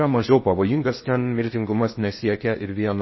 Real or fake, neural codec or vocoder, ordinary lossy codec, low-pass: fake; codec, 16 kHz in and 24 kHz out, 0.6 kbps, FocalCodec, streaming, 4096 codes; MP3, 24 kbps; 7.2 kHz